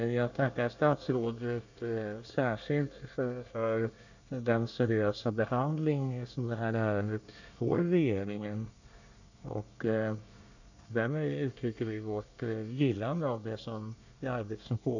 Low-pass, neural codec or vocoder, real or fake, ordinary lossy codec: 7.2 kHz; codec, 24 kHz, 1 kbps, SNAC; fake; none